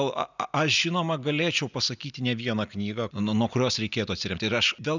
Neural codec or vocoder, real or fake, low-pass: none; real; 7.2 kHz